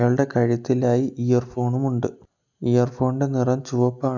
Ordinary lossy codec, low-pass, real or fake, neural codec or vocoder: none; 7.2 kHz; real; none